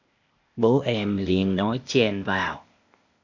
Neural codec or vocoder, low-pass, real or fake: codec, 16 kHz, 0.8 kbps, ZipCodec; 7.2 kHz; fake